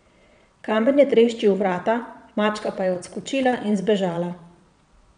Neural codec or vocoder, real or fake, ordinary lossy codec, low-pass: vocoder, 22.05 kHz, 80 mel bands, Vocos; fake; none; 9.9 kHz